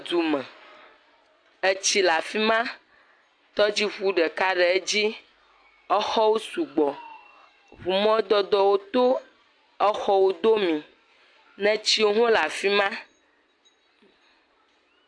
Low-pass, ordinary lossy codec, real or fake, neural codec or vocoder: 9.9 kHz; AAC, 64 kbps; real; none